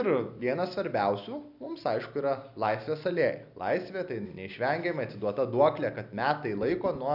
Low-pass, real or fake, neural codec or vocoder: 5.4 kHz; real; none